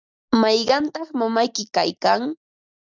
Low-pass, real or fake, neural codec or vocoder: 7.2 kHz; real; none